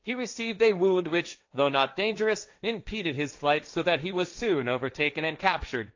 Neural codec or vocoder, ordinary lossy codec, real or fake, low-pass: codec, 16 kHz, 1.1 kbps, Voila-Tokenizer; AAC, 48 kbps; fake; 7.2 kHz